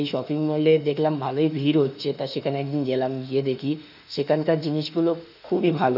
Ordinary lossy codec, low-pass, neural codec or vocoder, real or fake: none; 5.4 kHz; autoencoder, 48 kHz, 32 numbers a frame, DAC-VAE, trained on Japanese speech; fake